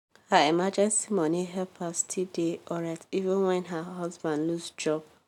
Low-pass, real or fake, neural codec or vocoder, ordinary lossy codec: 19.8 kHz; real; none; none